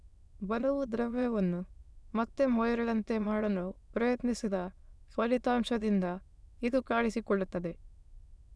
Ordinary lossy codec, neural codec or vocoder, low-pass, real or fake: none; autoencoder, 22.05 kHz, a latent of 192 numbers a frame, VITS, trained on many speakers; none; fake